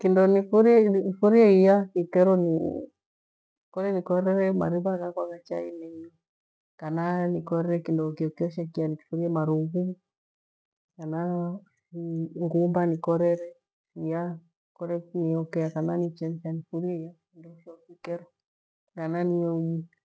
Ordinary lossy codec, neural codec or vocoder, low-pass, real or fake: none; none; none; real